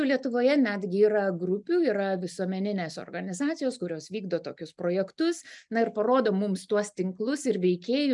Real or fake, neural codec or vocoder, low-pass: real; none; 10.8 kHz